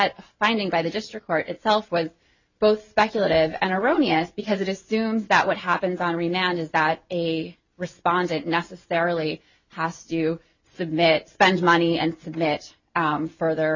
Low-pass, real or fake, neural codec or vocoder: 7.2 kHz; real; none